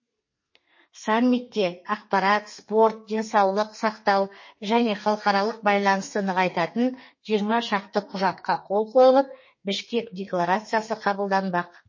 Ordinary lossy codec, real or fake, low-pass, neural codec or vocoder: MP3, 32 kbps; fake; 7.2 kHz; codec, 32 kHz, 1.9 kbps, SNAC